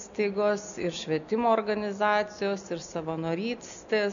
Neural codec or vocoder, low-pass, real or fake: none; 7.2 kHz; real